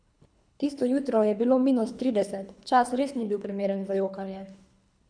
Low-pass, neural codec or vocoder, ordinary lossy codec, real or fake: 9.9 kHz; codec, 24 kHz, 3 kbps, HILCodec; none; fake